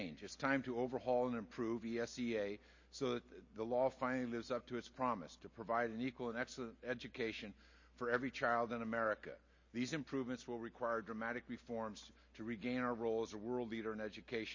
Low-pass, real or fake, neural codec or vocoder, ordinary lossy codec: 7.2 kHz; real; none; MP3, 32 kbps